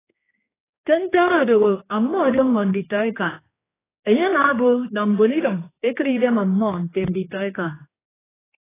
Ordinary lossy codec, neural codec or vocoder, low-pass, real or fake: AAC, 16 kbps; codec, 16 kHz, 2 kbps, X-Codec, HuBERT features, trained on general audio; 3.6 kHz; fake